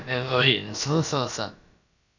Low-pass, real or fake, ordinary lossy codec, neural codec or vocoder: 7.2 kHz; fake; none; codec, 16 kHz, about 1 kbps, DyCAST, with the encoder's durations